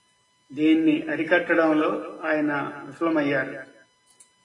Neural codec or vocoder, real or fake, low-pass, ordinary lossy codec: none; real; 10.8 kHz; AAC, 32 kbps